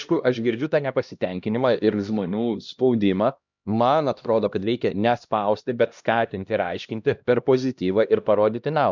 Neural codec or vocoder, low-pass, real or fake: codec, 16 kHz, 1 kbps, X-Codec, HuBERT features, trained on LibriSpeech; 7.2 kHz; fake